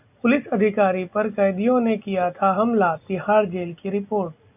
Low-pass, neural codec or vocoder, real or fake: 3.6 kHz; none; real